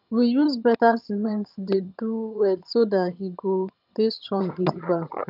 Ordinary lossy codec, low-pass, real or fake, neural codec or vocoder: none; 5.4 kHz; fake; vocoder, 22.05 kHz, 80 mel bands, HiFi-GAN